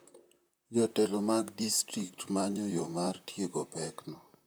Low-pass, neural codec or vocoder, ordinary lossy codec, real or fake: none; vocoder, 44.1 kHz, 128 mel bands, Pupu-Vocoder; none; fake